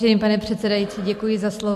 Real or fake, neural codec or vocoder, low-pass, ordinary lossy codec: real; none; 14.4 kHz; MP3, 64 kbps